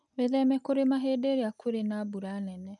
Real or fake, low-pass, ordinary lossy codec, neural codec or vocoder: real; 10.8 kHz; none; none